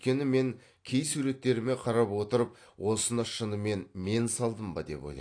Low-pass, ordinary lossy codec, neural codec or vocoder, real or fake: 9.9 kHz; AAC, 48 kbps; none; real